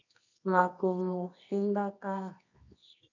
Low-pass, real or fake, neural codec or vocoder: 7.2 kHz; fake; codec, 24 kHz, 0.9 kbps, WavTokenizer, medium music audio release